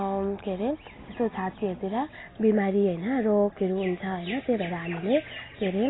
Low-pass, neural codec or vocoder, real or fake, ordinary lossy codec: 7.2 kHz; none; real; AAC, 16 kbps